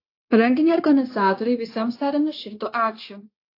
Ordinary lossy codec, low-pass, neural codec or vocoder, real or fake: AAC, 32 kbps; 5.4 kHz; codec, 16 kHz, 0.9 kbps, LongCat-Audio-Codec; fake